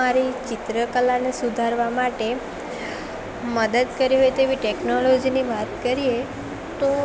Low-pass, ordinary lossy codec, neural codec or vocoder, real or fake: none; none; none; real